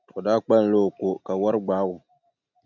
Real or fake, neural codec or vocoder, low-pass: real; none; 7.2 kHz